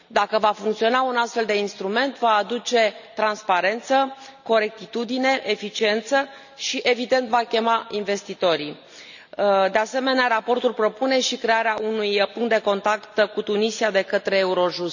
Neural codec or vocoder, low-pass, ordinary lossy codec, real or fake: none; 7.2 kHz; none; real